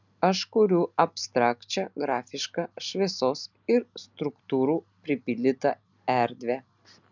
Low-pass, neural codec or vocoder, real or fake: 7.2 kHz; none; real